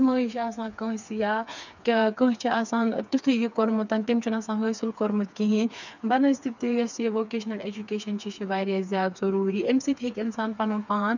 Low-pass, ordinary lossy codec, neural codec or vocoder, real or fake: 7.2 kHz; none; codec, 16 kHz, 4 kbps, FreqCodec, smaller model; fake